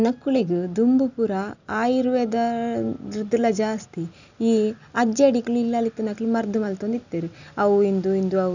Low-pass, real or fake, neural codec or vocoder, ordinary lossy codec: 7.2 kHz; real; none; none